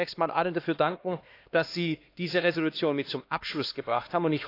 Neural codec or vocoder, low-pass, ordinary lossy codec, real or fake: codec, 16 kHz, 2 kbps, X-Codec, HuBERT features, trained on LibriSpeech; 5.4 kHz; AAC, 32 kbps; fake